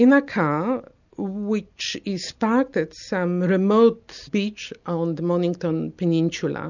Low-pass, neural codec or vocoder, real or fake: 7.2 kHz; none; real